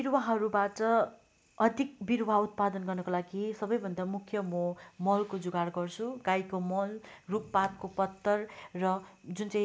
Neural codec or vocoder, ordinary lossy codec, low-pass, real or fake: none; none; none; real